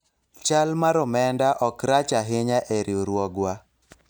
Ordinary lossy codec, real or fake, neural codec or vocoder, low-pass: none; real; none; none